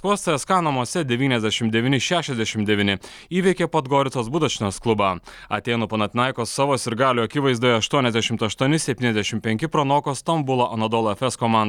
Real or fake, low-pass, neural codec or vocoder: real; 19.8 kHz; none